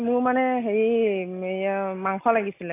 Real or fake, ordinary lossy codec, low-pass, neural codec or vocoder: real; AAC, 24 kbps; 3.6 kHz; none